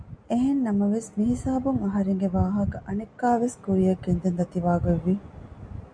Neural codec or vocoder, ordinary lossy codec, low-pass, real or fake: none; MP3, 96 kbps; 9.9 kHz; real